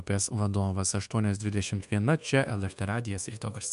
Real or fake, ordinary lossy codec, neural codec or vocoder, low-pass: fake; MP3, 64 kbps; codec, 24 kHz, 1.2 kbps, DualCodec; 10.8 kHz